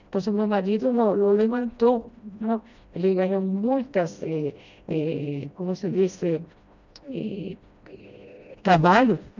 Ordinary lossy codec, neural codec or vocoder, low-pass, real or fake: none; codec, 16 kHz, 1 kbps, FreqCodec, smaller model; 7.2 kHz; fake